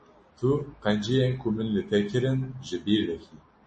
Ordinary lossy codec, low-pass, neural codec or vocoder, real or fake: MP3, 32 kbps; 10.8 kHz; none; real